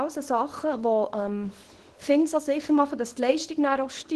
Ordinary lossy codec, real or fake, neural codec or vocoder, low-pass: Opus, 16 kbps; fake; codec, 24 kHz, 0.9 kbps, WavTokenizer, small release; 10.8 kHz